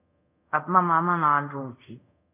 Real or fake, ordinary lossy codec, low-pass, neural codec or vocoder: fake; AAC, 32 kbps; 3.6 kHz; codec, 24 kHz, 0.5 kbps, DualCodec